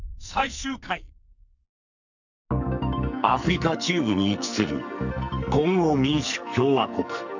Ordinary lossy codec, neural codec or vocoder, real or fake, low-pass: none; codec, 44.1 kHz, 2.6 kbps, SNAC; fake; 7.2 kHz